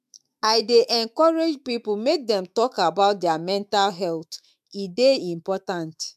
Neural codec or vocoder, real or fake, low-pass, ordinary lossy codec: autoencoder, 48 kHz, 128 numbers a frame, DAC-VAE, trained on Japanese speech; fake; 14.4 kHz; MP3, 96 kbps